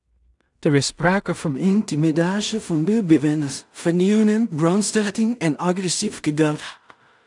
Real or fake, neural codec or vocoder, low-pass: fake; codec, 16 kHz in and 24 kHz out, 0.4 kbps, LongCat-Audio-Codec, two codebook decoder; 10.8 kHz